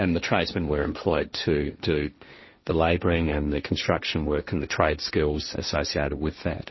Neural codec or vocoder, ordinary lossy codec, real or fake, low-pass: codec, 16 kHz, 1.1 kbps, Voila-Tokenizer; MP3, 24 kbps; fake; 7.2 kHz